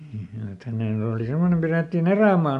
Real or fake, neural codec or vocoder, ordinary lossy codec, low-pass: real; none; AAC, 64 kbps; 10.8 kHz